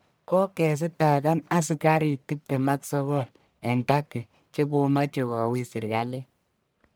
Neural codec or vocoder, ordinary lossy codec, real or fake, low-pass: codec, 44.1 kHz, 1.7 kbps, Pupu-Codec; none; fake; none